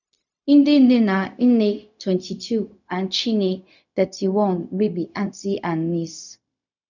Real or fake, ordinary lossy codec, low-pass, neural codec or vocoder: fake; none; 7.2 kHz; codec, 16 kHz, 0.4 kbps, LongCat-Audio-Codec